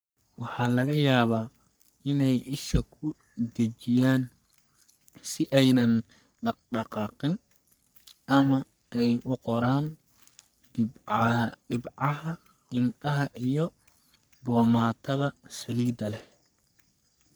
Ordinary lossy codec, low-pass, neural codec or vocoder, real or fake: none; none; codec, 44.1 kHz, 3.4 kbps, Pupu-Codec; fake